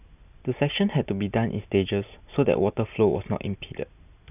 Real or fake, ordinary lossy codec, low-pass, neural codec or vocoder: real; none; 3.6 kHz; none